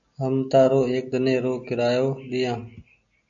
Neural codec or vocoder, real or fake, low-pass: none; real; 7.2 kHz